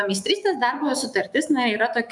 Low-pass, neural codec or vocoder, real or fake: 10.8 kHz; autoencoder, 48 kHz, 128 numbers a frame, DAC-VAE, trained on Japanese speech; fake